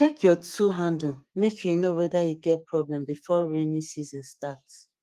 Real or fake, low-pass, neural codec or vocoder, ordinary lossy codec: fake; 14.4 kHz; codec, 32 kHz, 1.9 kbps, SNAC; Opus, 32 kbps